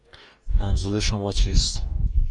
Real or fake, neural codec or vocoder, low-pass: fake; codec, 44.1 kHz, 2.6 kbps, DAC; 10.8 kHz